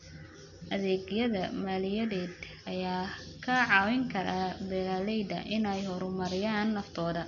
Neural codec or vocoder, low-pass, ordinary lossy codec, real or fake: none; 7.2 kHz; none; real